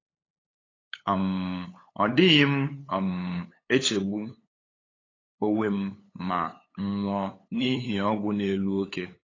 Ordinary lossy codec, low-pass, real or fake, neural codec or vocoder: AAC, 32 kbps; 7.2 kHz; fake; codec, 16 kHz, 8 kbps, FunCodec, trained on LibriTTS, 25 frames a second